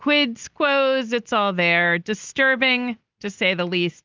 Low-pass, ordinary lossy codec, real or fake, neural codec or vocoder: 7.2 kHz; Opus, 24 kbps; real; none